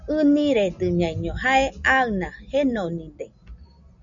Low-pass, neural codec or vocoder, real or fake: 7.2 kHz; none; real